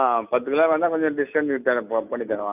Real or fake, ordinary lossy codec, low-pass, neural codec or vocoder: real; none; 3.6 kHz; none